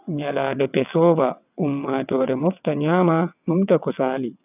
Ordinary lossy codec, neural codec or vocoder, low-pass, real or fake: none; vocoder, 22.05 kHz, 80 mel bands, WaveNeXt; 3.6 kHz; fake